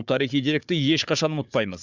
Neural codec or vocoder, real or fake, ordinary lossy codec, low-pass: codec, 16 kHz, 4 kbps, FunCodec, trained on Chinese and English, 50 frames a second; fake; none; 7.2 kHz